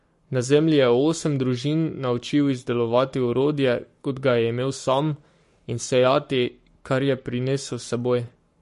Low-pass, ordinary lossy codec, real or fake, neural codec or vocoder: 14.4 kHz; MP3, 48 kbps; fake; codec, 44.1 kHz, 7.8 kbps, Pupu-Codec